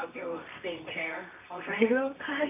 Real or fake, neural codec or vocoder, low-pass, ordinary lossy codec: fake; codec, 24 kHz, 0.9 kbps, WavTokenizer, medium music audio release; 3.6 kHz; MP3, 32 kbps